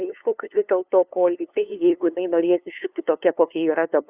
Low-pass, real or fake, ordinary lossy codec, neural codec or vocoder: 3.6 kHz; fake; Opus, 64 kbps; codec, 16 kHz, 2 kbps, FunCodec, trained on LibriTTS, 25 frames a second